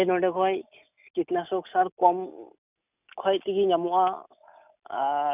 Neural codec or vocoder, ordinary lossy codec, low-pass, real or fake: none; none; 3.6 kHz; real